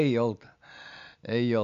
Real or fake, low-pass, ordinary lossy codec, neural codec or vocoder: real; 7.2 kHz; none; none